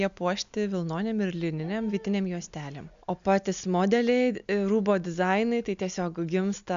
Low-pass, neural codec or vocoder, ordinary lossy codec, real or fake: 7.2 kHz; none; MP3, 64 kbps; real